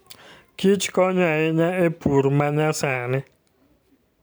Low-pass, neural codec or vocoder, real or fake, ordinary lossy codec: none; vocoder, 44.1 kHz, 128 mel bands every 512 samples, BigVGAN v2; fake; none